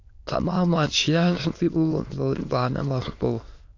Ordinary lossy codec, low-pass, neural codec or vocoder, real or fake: AAC, 48 kbps; 7.2 kHz; autoencoder, 22.05 kHz, a latent of 192 numbers a frame, VITS, trained on many speakers; fake